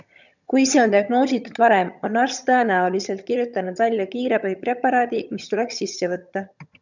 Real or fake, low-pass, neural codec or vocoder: fake; 7.2 kHz; vocoder, 22.05 kHz, 80 mel bands, HiFi-GAN